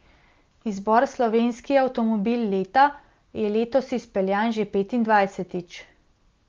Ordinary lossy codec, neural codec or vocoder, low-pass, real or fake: Opus, 32 kbps; none; 7.2 kHz; real